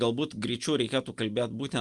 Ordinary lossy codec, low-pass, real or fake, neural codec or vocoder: Opus, 32 kbps; 10.8 kHz; real; none